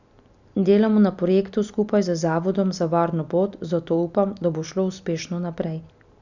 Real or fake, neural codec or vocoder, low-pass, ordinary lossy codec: real; none; 7.2 kHz; none